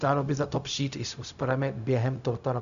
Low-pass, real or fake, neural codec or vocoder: 7.2 kHz; fake; codec, 16 kHz, 0.4 kbps, LongCat-Audio-Codec